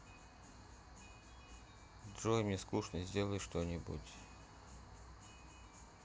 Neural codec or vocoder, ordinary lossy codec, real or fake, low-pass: none; none; real; none